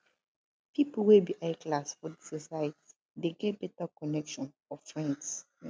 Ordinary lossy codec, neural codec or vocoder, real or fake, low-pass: none; none; real; none